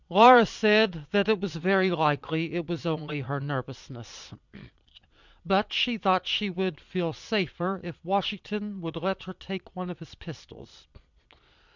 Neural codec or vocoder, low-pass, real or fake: vocoder, 22.05 kHz, 80 mel bands, Vocos; 7.2 kHz; fake